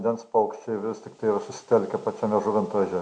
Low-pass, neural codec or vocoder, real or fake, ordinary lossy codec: 9.9 kHz; none; real; MP3, 64 kbps